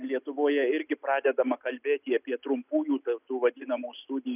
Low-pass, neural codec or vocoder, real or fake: 3.6 kHz; none; real